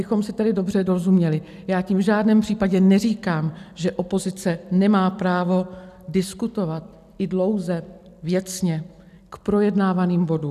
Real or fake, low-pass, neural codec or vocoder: real; 14.4 kHz; none